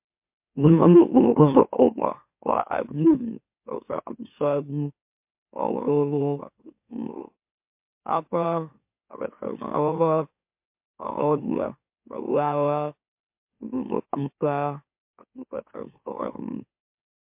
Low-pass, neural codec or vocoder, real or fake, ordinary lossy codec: 3.6 kHz; autoencoder, 44.1 kHz, a latent of 192 numbers a frame, MeloTTS; fake; MP3, 32 kbps